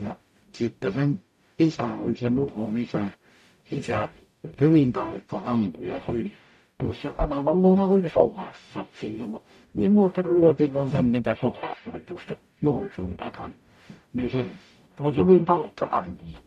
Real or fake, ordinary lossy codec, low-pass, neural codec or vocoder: fake; MP3, 64 kbps; 14.4 kHz; codec, 44.1 kHz, 0.9 kbps, DAC